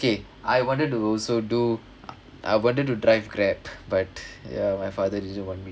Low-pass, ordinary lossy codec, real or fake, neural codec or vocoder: none; none; real; none